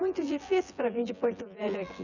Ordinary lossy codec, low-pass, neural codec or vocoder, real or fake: none; 7.2 kHz; vocoder, 24 kHz, 100 mel bands, Vocos; fake